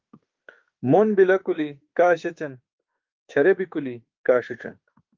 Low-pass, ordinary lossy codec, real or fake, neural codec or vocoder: 7.2 kHz; Opus, 32 kbps; fake; autoencoder, 48 kHz, 32 numbers a frame, DAC-VAE, trained on Japanese speech